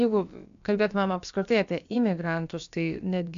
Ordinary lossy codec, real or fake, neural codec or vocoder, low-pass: MP3, 64 kbps; fake; codec, 16 kHz, about 1 kbps, DyCAST, with the encoder's durations; 7.2 kHz